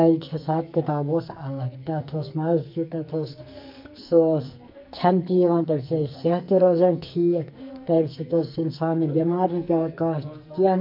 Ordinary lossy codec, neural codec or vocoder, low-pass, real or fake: MP3, 48 kbps; codec, 44.1 kHz, 2.6 kbps, SNAC; 5.4 kHz; fake